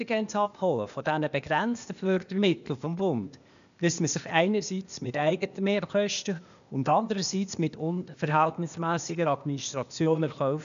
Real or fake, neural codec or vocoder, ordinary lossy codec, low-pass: fake; codec, 16 kHz, 0.8 kbps, ZipCodec; MP3, 96 kbps; 7.2 kHz